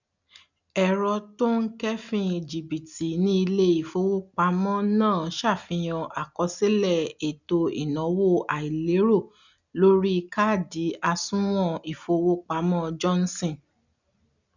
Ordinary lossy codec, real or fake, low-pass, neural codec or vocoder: none; real; 7.2 kHz; none